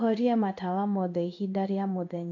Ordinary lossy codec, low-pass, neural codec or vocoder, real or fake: AAC, 48 kbps; 7.2 kHz; codec, 16 kHz in and 24 kHz out, 1 kbps, XY-Tokenizer; fake